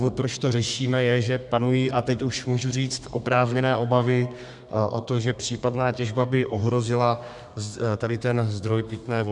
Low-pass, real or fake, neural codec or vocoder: 10.8 kHz; fake; codec, 32 kHz, 1.9 kbps, SNAC